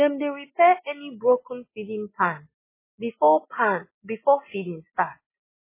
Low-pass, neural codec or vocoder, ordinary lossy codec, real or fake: 3.6 kHz; none; MP3, 16 kbps; real